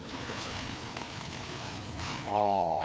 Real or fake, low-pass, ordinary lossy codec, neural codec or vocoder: fake; none; none; codec, 16 kHz, 2 kbps, FreqCodec, larger model